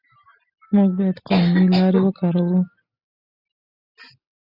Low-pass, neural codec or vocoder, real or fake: 5.4 kHz; none; real